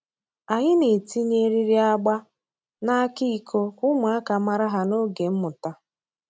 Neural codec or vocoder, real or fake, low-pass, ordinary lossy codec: none; real; none; none